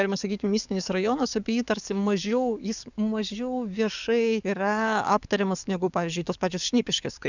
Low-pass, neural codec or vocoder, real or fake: 7.2 kHz; codec, 44.1 kHz, 7.8 kbps, DAC; fake